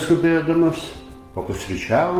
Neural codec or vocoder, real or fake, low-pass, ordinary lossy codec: codec, 44.1 kHz, 7.8 kbps, DAC; fake; 14.4 kHz; Opus, 24 kbps